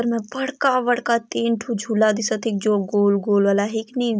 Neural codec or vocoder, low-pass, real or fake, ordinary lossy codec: none; none; real; none